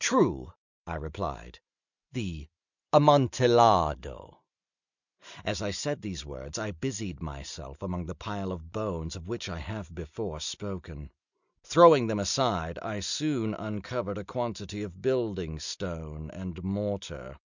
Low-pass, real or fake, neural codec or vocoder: 7.2 kHz; real; none